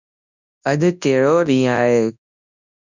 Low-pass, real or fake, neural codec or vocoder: 7.2 kHz; fake; codec, 24 kHz, 0.9 kbps, WavTokenizer, large speech release